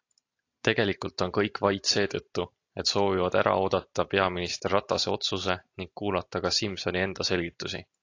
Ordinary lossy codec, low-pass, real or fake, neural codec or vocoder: AAC, 48 kbps; 7.2 kHz; real; none